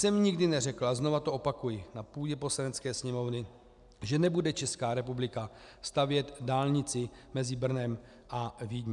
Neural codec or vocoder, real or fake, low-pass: none; real; 10.8 kHz